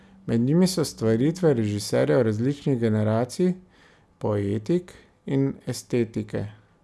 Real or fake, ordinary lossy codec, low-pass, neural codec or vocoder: real; none; none; none